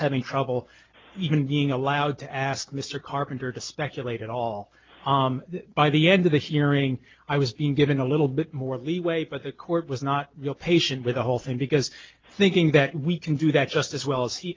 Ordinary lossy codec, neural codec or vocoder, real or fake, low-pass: Opus, 24 kbps; none; real; 7.2 kHz